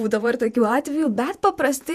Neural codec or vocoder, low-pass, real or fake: vocoder, 48 kHz, 128 mel bands, Vocos; 14.4 kHz; fake